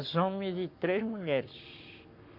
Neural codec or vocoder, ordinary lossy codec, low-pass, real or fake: codec, 44.1 kHz, 7.8 kbps, Pupu-Codec; MP3, 48 kbps; 5.4 kHz; fake